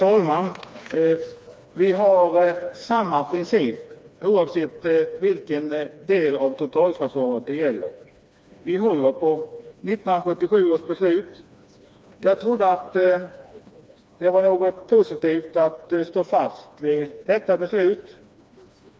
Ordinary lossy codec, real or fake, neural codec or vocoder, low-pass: none; fake; codec, 16 kHz, 2 kbps, FreqCodec, smaller model; none